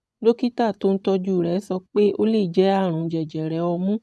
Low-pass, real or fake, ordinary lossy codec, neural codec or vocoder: none; fake; none; vocoder, 24 kHz, 100 mel bands, Vocos